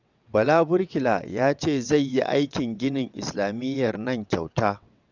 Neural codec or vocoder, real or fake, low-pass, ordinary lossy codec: vocoder, 22.05 kHz, 80 mel bands, WaveNeXt; fake; 7.2 kHz; none